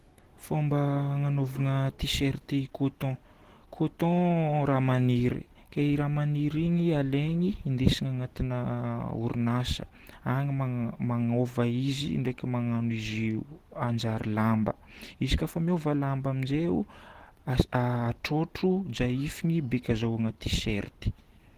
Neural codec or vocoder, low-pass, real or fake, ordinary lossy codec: none; 14.4 kHz; real; Opus, 16 kbps